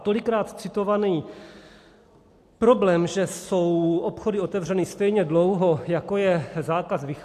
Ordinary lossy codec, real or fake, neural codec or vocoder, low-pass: AAC, 64 kbps; real; none; 14.4 kHz